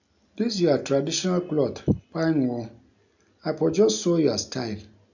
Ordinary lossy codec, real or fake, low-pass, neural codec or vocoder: none; real; 7.2 kHz; none